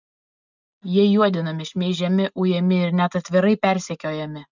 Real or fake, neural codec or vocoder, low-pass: real; none; 7.2 kHz